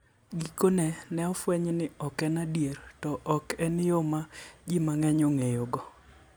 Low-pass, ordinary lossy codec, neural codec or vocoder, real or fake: none; none; none; real